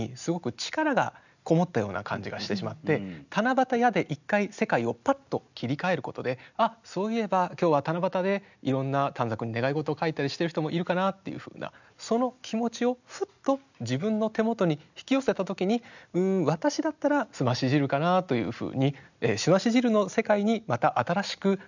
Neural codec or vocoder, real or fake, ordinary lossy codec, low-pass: none; real; none; 7.2 kHz